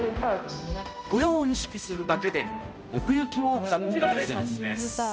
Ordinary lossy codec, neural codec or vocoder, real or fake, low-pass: none; codec, 16 kHz, 0.5 kbps, X-Codec, HuBERT features, trained on balanced general audio; fake; none